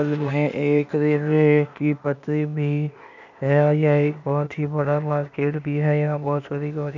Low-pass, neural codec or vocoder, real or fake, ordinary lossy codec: 7.2 kHz; codec, 16 kHz, 0.8 kbps, ZipCodec; fake; none